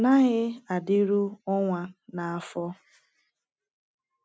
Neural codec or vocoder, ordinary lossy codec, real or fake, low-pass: none; none; real; none